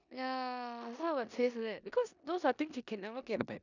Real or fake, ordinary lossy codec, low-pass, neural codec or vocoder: fake; none; 7.2 kHz; codec, 16 kHz in and 24 kHz out, 0.9 kbps, LongCat-Audio-Codec, four codebook decoder